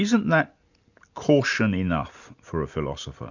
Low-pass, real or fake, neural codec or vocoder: 7.2 kHz; real; none